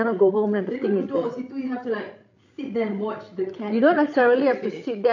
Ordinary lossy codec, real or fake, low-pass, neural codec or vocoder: none; fake; 7.2 kHz; codec, 16 kHz, 16 kbps, FreqCodec, larger model